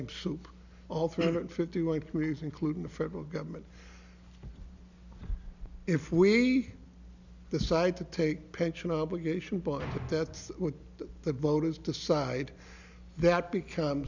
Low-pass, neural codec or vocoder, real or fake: 7.2 kHz; none; real